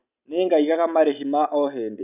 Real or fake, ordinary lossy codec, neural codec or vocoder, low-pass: real; none; none; 3.6 kHz